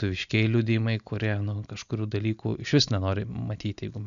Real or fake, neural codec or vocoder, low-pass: real; none; 7.2 kHz